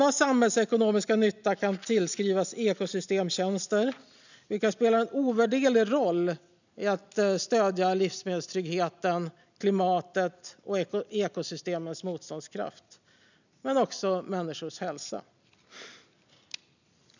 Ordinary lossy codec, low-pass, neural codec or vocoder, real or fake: none; 7.2 kHz; none; real